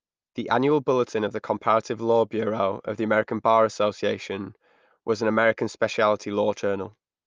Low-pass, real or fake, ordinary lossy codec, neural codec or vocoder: 7.2 kHz; real; Opus, 24 kbps; none